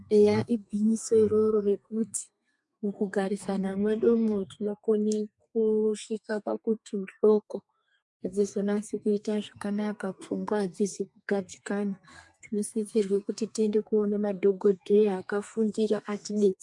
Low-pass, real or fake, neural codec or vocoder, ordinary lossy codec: 10.8 kHz; fake; codec, 32 kHz, 1.9 kbps, SNAC; MP3, 64 kbps